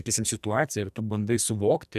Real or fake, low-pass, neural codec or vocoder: fake; 14.4 kHz; codec, 44.1 kHz, 2.6 kbps, SNAC